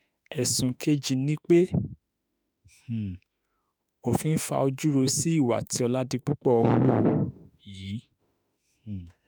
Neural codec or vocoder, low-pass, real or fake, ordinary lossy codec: autoencoder, 48 kHz, 32 numbers a frame, DAC-VAE, trained on Japanese speech; none; fake; none